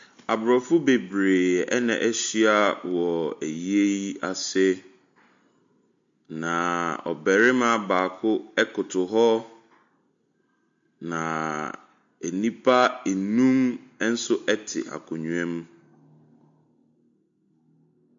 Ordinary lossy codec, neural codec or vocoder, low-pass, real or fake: MP3, 48 kbps; none; 7.2 kHz; real